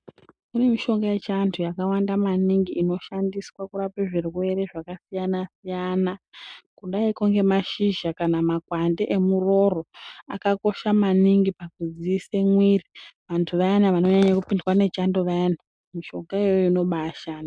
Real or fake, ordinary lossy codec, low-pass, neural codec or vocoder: real; MP3, 96 kbps; 9.9 kHz; none